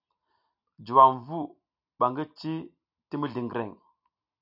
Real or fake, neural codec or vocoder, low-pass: real; none; 5.4 kHz